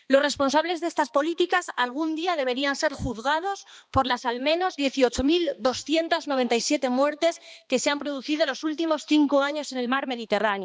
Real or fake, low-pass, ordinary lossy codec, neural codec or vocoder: fake; none; none; codec, 16 kHz, 2 kbps, X-Codec, HuBERT features, trained on balanced general audio